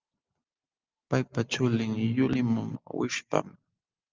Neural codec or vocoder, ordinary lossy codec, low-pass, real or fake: none; Opus, 24 kbps; 7.2 kHz; real